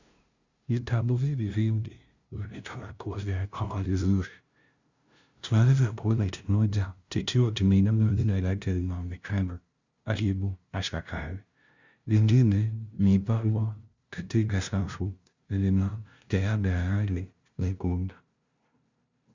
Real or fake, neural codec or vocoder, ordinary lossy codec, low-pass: fake; codec, 16 kHz, 0.5 kbps, FunCodec, trained on LibriTTS, 25 frames a second; Opus, 64 kbps; 7.2 kHz